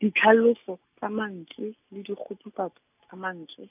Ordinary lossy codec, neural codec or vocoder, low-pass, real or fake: none; none; 3.6 kHz; real